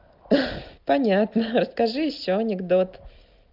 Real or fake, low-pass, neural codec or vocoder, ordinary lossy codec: real; 5.4 kHz; none; Opus, 32 kbps